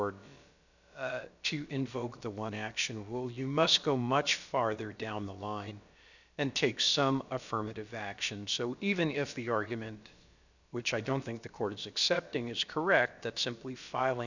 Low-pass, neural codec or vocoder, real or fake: 7.2 kHz; codec, 16 kHz, about 1 kbps, DyCAST, with the encoder's durations; fake